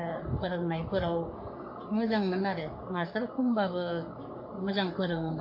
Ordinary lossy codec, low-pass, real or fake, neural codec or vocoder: MP3, 32 kbps; 5.4 kHz; fake; codec, 44.1 kHz, 3.4 kbps, Pupu-Codec